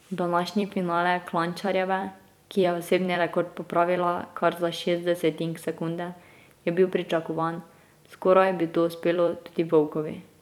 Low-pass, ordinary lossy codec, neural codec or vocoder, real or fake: 19.8 kHz; none; vocoder, 44.1 kHz, 128 mel bands every 256 samples, BigVGAN v2; fake